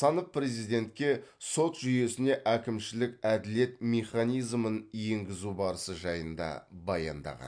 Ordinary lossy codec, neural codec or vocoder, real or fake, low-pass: MP3, 64 kbps; none; real; 9.9 kHz